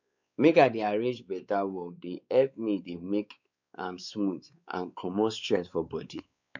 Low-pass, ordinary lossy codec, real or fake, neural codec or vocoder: 7.2 kHz; none; fake; codec, 16 kHz, 4 kbps, X-Codec, WavLM features, trained on Multilingual LibriSpeech